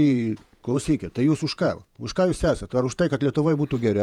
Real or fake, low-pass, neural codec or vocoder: fake; 19.8 kHz; vocoder, 48 kHz, 128 mel bands, Vocos